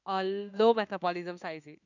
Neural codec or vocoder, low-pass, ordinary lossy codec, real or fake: autoencoder, 48 kHz, 32 numbers a frame, DAC-VAE, trained on Japanese speech; 7.2 kHz; none; fake